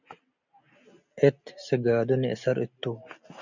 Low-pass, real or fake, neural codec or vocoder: 7.2 kHz; real; none